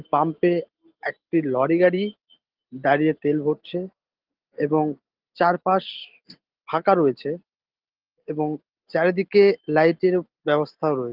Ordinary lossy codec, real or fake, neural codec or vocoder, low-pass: Opus, 16 kbps; real; none; 5.4 kHz